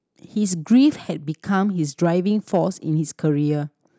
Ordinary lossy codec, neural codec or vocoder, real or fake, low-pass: none; none; real; none